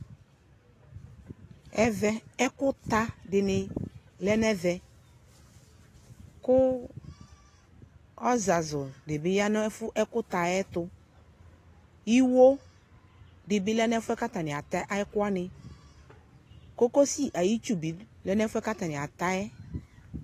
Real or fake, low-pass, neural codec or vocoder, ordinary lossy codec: real; 14.4 kHz; none; AAC, 48 kbps